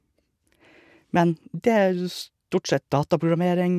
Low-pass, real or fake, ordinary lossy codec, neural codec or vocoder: 14.4 kHz; real; none; none